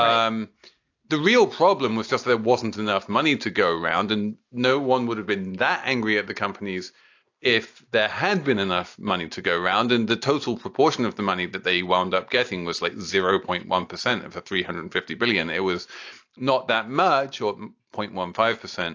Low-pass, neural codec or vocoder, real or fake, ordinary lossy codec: 7.2 kHz; none; real; AAC, 48 kbps